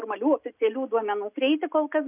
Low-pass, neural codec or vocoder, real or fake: 3.6 kHz; none; real